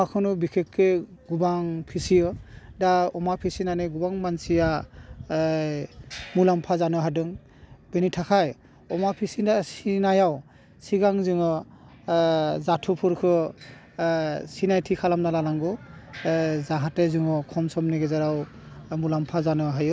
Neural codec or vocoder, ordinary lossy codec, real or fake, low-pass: none; none; real; none